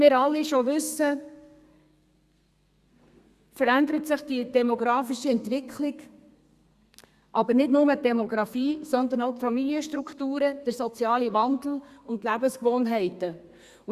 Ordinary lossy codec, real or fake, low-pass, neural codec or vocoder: Opus, 64 kbps; fake; 14.4 kHz; codec, 32 kHz, 1.9 kbps, SNAC